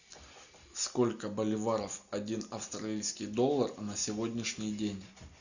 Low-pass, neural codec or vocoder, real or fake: 7.2 kHz; none; real